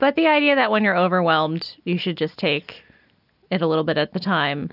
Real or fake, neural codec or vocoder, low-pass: real; none; 5.4 kHz